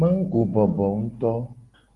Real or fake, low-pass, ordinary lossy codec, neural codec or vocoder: real; 10.8 kHz; Opus, 24 kbps; none